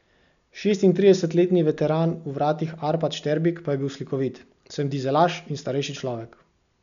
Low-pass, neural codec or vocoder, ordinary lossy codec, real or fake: 7.2 kHz; none; none; real